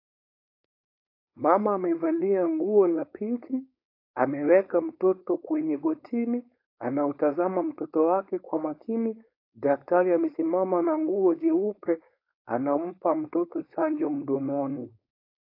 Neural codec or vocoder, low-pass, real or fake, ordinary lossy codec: codec, 16 kHz, 4.8 kbps, FACodec; 5.4 kHz; fake; AAC, 32 kbps